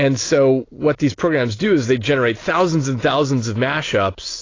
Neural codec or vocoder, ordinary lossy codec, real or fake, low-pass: none; AAC, 32 kbps; real; 7.2 kHz